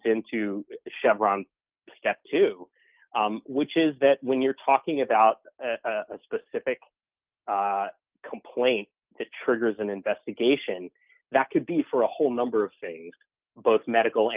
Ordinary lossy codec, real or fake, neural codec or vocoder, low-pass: Opus, 32 kbps; real; none; 3.6 kHz